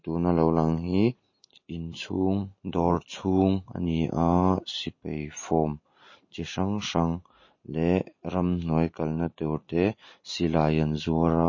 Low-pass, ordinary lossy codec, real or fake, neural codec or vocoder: 7.2 kHz; MP3, 32 kbps; real; none